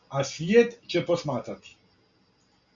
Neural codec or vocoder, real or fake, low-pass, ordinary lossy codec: none; real; 7.2 kHz; MP3, 48 kbps